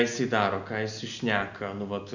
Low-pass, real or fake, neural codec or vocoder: 7.2 kHz; real; none